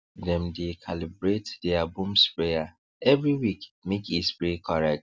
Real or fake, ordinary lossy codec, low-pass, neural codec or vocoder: real; none; none; none